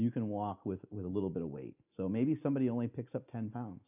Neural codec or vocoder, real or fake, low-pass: none; real; 3.6 kHz